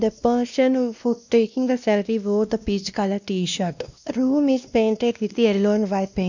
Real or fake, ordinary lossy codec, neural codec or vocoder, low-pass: fake; none; codec, 16 kHz, 1 kbps, X-Codec, WavLM features, trained on Multilingual LibriSpeech; 7.2 kHz